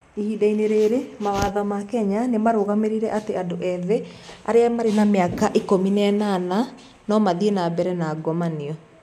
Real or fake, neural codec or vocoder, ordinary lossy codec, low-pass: real; none; none; 14.4 kHz